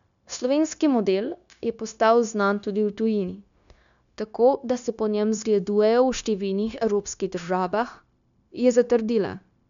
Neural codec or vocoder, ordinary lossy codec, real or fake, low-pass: codec, 16 kHz, 0.9 kbps, LongCat-Audio-Codec; none; fake; 7.2 kHz